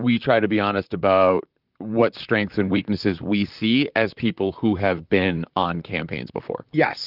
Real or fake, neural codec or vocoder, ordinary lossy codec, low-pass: fake; vocoder, 44.1 kHz, 128 mel bands, Pupu-Vocoder; Opus, 24 kbps; 5.4 kHz